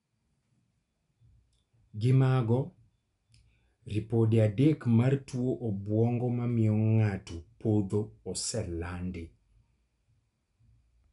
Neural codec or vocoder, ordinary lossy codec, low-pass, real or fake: none; none; 10.8 kHz; real